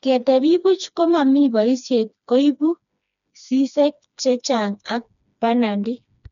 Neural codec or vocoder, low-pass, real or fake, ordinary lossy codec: codec, 16 kHz, 2 kbps, FreqCodec, smaller model; 7.2 kHz; fake; none